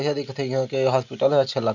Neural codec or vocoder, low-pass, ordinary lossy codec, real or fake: none; 7.2 kHz; none; real